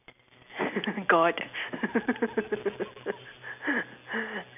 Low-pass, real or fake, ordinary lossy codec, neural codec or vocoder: 3.6 kHz; real; none; none